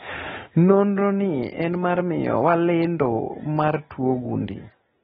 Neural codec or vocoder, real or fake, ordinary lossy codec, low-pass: none; real; AAC, 16 kbps; 19.8 kHz